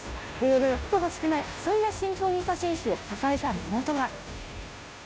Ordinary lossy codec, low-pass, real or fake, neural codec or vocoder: none; none; fake; codec, 16 kHz, 0.5 kbps, FunCodec, trained on Chinese and English, 25 frames a second